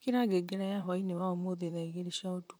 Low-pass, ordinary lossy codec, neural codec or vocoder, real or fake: none; none; vocoder, 44.1 kHz, 128 mel bands, Pupu-Vocoder; fake